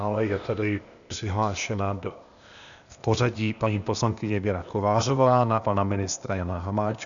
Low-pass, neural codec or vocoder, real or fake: 7.2 kHz; codec, 16 kHz, 0.8 kbps, ZipCodec; fake